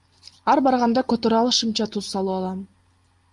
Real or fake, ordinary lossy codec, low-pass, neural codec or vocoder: real; Opus, 32 kbps; 10.8 kHz; none